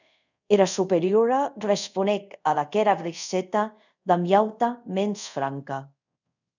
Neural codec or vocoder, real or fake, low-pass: codec, 24 kHz, 0.5 kbps, DualCodec; fake; 7.2 kHz